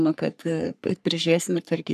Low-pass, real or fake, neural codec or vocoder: 14.4 kHz; fake; codec, 44.1 kHz, 3.4 kbps, Pupu-Codec